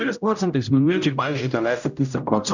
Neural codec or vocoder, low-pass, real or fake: codec, 16 kHz, 0.5 kbps, X-Codec, HuBERT features, trained on general audio; 7.2 kHz; fake